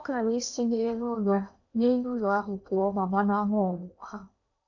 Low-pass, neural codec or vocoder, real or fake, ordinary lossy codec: 7.2 kHz; codec, 16 kHz in and 24 kHz out, 0.8 kbps, FocalCodec, streaming, 65536 codes; fake; none